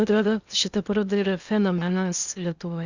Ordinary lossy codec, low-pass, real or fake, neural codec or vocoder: Opus, 64 kbps; 7.2 kHz; fake; codec, 16 kHz in and 24 kHz out, 0.8 kbps, FocalCodec, streaming, 65536 codes